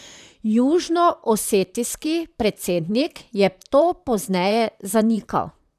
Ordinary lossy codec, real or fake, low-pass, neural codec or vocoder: none; fake; 14.4 kHz; vocoder, 44.1 kHz, 128 mel bands, Pupu-Vocoder